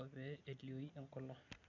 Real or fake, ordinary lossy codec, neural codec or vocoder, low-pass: real; none; none; 7.2 kHz